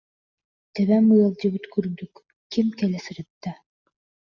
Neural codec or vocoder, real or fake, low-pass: none; real; 7.2 kHz